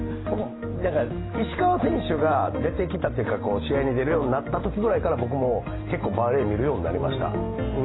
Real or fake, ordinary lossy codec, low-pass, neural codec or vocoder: real; AAC, 16 kbps; 7.2 kHz; none